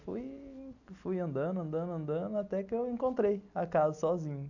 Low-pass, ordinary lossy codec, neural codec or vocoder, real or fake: 7.2 kHz; none; none; real